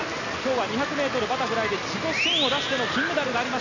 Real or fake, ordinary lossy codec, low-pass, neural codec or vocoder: real; none; 7.2 kHz; none